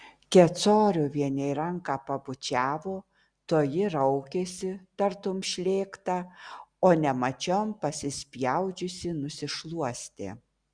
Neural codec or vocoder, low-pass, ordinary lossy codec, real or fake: none; 9.9 kHz; Opus, 64 kbps; real